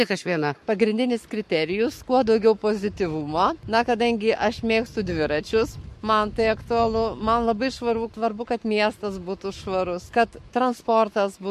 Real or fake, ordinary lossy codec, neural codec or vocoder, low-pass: fake; MP3, 64 kbps; codec, 44.1 kHz, 7.8 kbps, Pupu-Codec; 14.4 kHz